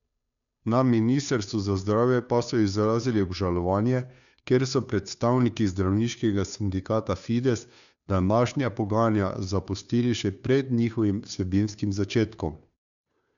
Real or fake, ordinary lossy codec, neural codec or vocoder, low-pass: fake; none; codec, 16 kHz, 2 kbps, FunCodec, trained on Chinese and English, 25 frames a second; 7.2 kHz